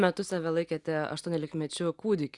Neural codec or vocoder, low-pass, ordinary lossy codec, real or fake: none; 10.8 kHz; Opus, 64 kbps; real